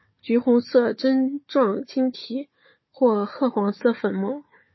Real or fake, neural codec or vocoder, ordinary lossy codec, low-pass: fake; codec, 16 kHz, 4 kbps, FunCodec, trained on Chinese and English, 50 frames a second; MP3, 24 kbps; 7.2 kHz